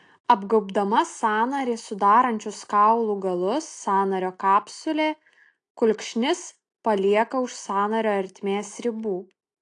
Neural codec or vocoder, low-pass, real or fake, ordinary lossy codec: none; 9.9 kHz; real; AAC, 48 kbps